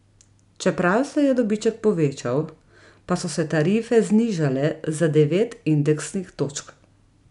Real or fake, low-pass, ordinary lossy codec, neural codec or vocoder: real; 10.8 kHz; none; none